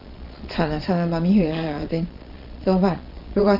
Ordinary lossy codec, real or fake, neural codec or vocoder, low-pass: Opus, 32 kbps; fake; vocoder, 22.05 kHz, 80 mel bands, Vocos; 5.4 kHz